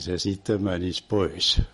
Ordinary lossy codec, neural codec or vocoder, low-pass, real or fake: MP3, 48 kbps; none; 19.8 kHz; real